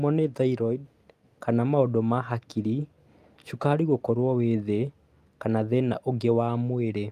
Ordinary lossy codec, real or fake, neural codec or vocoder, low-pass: Opus, 32 kbps; real; none; 14.4 kHz